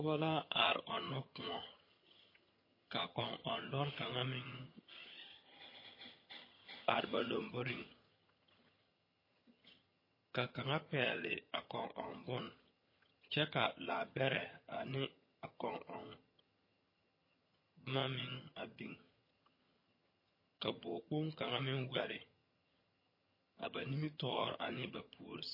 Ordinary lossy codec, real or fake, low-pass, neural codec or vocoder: MP3, 24 kbps; fake; 5.4 kHz; vocoder, 22.05 kHz, 80 mel bands, HiFi-GAN